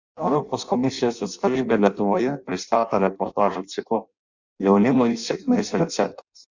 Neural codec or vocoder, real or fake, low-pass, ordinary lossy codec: codec, 16 kHz in and 24 kHz out, 0.6 kbps, FireRedTTS-2 codec; fake; 7.2 kHz; Opus, 64 kbps